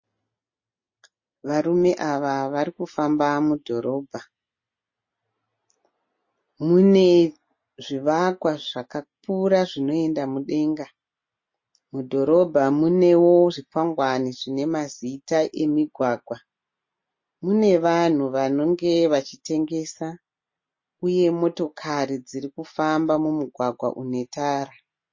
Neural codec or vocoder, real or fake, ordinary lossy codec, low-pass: none; real; MP3, 32 kbps; 7.2 kHz